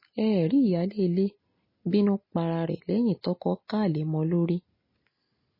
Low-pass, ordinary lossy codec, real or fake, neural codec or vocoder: 5.4 kHz; MP3, 24 kbps; real; none